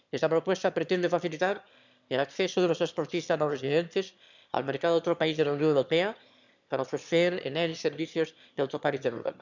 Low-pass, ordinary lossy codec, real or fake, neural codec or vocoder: 7.2 kHz; none; fake; autoencoder, 22.05 kHz, a latent of 192 numbers a frame, VITS, trained on one speaker